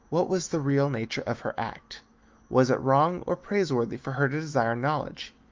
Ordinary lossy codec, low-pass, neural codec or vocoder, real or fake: Opus, 32 kbps; 7.2 kHz; autoencoder, 48 kHz, 128 numbers a frame, DAC-VAE, trained on Japanese speech; fake